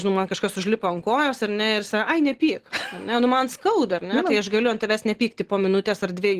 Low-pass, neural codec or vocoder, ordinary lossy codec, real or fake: 14.4 kHz; none; Opus, 16 kbps; real